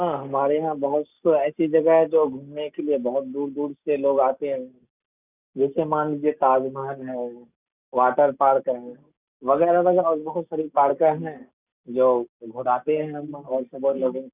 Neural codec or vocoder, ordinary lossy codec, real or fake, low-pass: none; none; real; 3.6 kHz